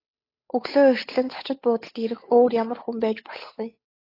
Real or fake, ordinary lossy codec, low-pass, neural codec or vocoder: fake; AAC, 24 kbps; 5.4 kHz; codec, 16 kHz, 8 kbps, FunCodec, trained on Chinese and English, 25 frames a second